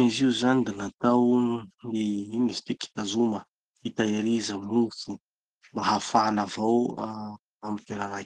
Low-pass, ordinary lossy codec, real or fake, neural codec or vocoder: 10.8 kHz; Opus, 16 kbps; real; none